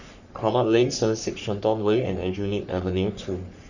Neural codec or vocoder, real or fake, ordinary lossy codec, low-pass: codec, 44.1 kHz, 3.4 kbps, Pupu-Codec; fake; none; 7.2 kHz